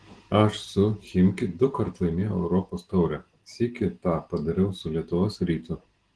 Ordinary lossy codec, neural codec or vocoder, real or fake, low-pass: Opus, 16 kbps; none; real; 9.9 kHz